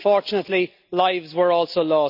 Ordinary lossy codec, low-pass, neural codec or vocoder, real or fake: none; 5.4 kHz; none; real